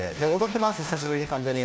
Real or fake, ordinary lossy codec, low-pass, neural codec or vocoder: fake; none; none; codec, 16 kHz, 1 kbps, FunCodec, trained on LibriTTS, 50 frames a second